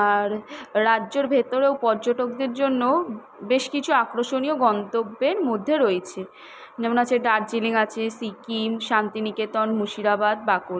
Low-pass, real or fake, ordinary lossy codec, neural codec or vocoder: none; real; none; none